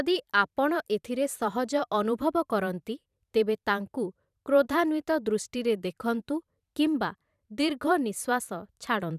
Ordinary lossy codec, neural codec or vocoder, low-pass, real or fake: none; vocoder, 44.1 kHz, 128 mel bands every 512 samples, BigVGAN v2; 14.4 kHz; fake